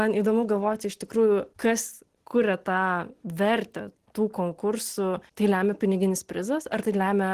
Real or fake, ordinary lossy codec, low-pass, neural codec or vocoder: real; Opus, 16 kbps; 14.4 kHz; none